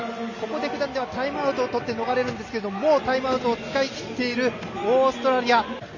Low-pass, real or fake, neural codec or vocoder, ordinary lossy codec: 7.2 kHz; real; none; none